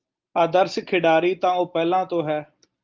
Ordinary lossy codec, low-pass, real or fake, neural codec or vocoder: Opus, 24 kbps; 7.2 kHz; real; none